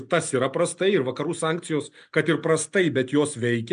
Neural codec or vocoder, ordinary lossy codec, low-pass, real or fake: none; MP3, 96 kbps; 9.9 kHz; real